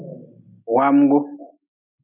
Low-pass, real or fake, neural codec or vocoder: 3.6 kHz; real; none